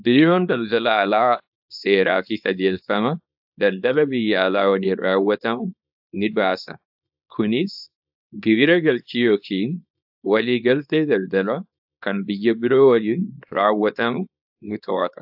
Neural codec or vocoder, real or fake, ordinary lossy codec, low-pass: codec, 24 kHz, 0.9 kbps, WavTokenizer, small release; fake; AAC, 48 kbps; 5.4 kHz